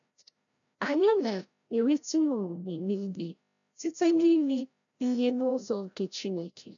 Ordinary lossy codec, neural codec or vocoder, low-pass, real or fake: none; codec, 16 kHz, 0.5 kbps, FreqCodec, larger model; 7.2 kHz; fake